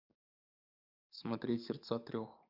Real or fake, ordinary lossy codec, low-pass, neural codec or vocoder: fake; none; 5.4 kHz; codec, 44.1 kHz, 7.8 kbps, DAC